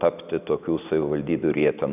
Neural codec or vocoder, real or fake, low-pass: none; real; 3.6 kHz